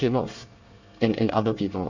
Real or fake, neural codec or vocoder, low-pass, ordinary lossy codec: fake; codec, 24 kHz, 1 kbps, SNAC; 7.2 kHz; none